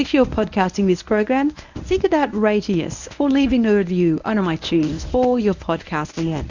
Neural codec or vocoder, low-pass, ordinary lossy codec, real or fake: codec, 24 kHz, 0.9 kbps, WavTokenizer, medium speech release version 1; 7.2 kHz; Opus, 64 kbps; fake